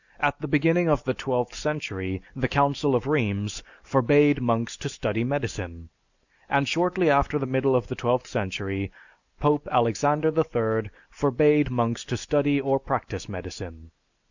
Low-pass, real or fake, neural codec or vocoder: 7.2 kHz; real; none